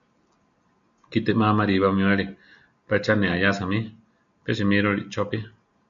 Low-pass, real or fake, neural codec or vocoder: 7.2 kHz; real; none